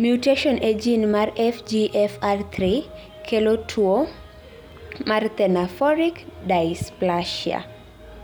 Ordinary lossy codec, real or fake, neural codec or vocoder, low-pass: none; real; none; none